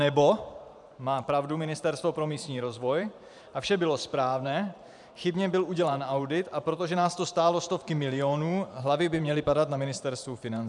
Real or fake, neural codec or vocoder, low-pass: fake; vocoder, 44.1 kHz, 128 mel bands every 512 samples, BigVGAN v2; 10.8 kHz